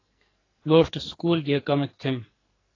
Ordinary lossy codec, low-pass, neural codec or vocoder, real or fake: AAC, 32 kbps; 7.2 kHz; codec, 44.1 kHz, 2.6 kbps, SNAC; fake